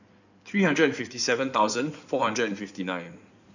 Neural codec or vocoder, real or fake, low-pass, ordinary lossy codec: codec, 16 kHz in and 24 kHz out, 2.2 kbps, FireRedTTS-2 codec; fake; 7.2 kHz; none